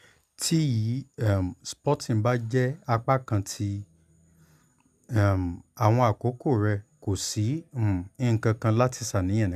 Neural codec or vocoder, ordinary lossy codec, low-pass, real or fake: none; none; 14.4 kHz; real